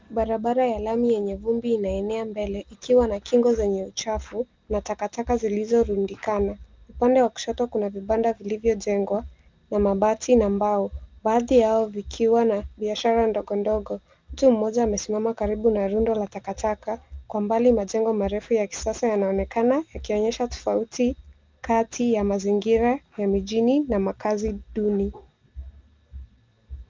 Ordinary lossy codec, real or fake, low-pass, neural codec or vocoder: Opus, 32 kbps; real; 7.2 kHz; none